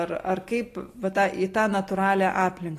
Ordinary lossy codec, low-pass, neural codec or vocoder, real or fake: AAC, 48 kbps; 14.4 kHz; none; real